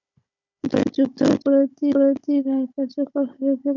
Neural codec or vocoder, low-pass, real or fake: codec, 16 kHz, 16 kbps, FunCodec, trained on Chinese and English, 50 frames a second; 7.2 kHz; fake